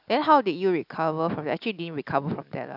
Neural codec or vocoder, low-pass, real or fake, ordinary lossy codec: none; 5.4 kHz; real; none